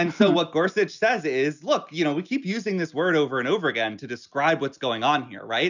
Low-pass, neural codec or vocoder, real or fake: 7.2 kHz; none; real